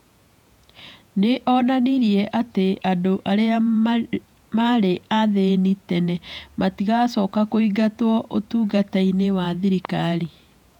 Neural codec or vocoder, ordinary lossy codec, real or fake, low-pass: vocoder, 48 kHz, 128 mel bands, Vocos; none; fake; 19.8 kHz